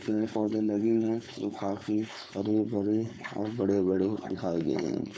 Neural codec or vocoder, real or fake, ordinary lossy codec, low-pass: codec, 16 kHz, 4.8 kbps, FACodec; fake; none; none